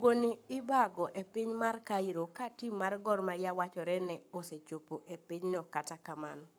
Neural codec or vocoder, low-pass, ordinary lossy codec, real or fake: codec, 44.1 kHz, 7.8 kbps, Pupu-Codec; none; none; fake